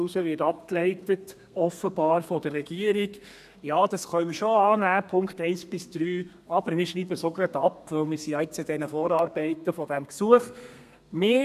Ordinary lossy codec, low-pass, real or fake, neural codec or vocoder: none; 14.4 kHz; fake; codec, 32 kHz, 1.9 kbps, SNAC